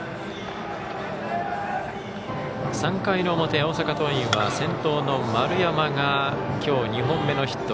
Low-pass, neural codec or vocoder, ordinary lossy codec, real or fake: none; none; none; real